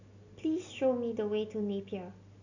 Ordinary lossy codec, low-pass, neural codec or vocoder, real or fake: none; 7.2 kHz; none; real